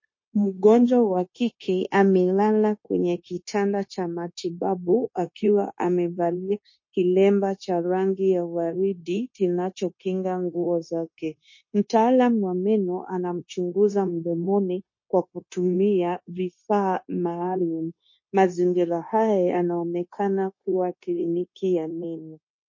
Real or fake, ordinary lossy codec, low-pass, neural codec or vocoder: fake; MP3, 32 kbps; 7.2 kHz; codec, 16 kHz, 0.9 kbps, LongCat-Audio-Codec